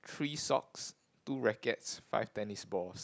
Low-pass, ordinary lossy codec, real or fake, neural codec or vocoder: none; none; real; none